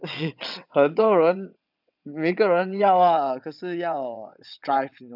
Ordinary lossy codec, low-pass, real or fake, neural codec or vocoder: none; 5.4 kHz; fake; vocoder, 22.05 kHz, 80 mel bands, WaveNeXt